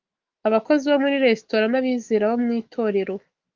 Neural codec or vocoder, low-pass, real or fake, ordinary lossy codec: autoencoder, 48 kHz, 128 numbers a frame, DAC-VAE, trained on Japanese speech; 7.2 kHz; fake; Opus, 32 kbps